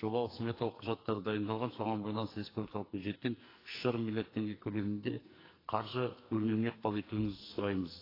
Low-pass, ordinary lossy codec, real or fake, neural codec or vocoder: 5.4 kHz; AAC, 24 kbps; fake; codec, 44.1 kHz, 2.6 kbps, SNAC